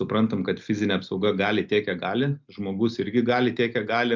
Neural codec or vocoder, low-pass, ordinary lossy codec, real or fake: none; 7.2 kHz; MP3, 64 kbps; real